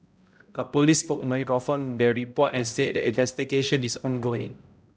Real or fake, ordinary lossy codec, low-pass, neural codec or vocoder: fake; none; none; codec, 16 kHz, 0.5 kbps, X-Codec, HuBERT features, trained on balanced general audio